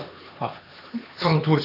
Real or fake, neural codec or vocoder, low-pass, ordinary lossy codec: fake; codec, 16 kHz, 2 kbps, X-Codec, HuBERT features, trained on LibriSpeech; 5.4 kHz; none